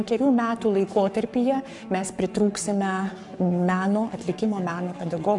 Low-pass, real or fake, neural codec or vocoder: 10.8 kHz; fake; codec, 44.1 kHz, 7.8 kbps, Pupu-Codec